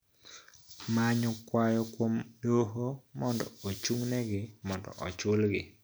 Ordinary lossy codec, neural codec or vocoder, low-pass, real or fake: none; none; none; real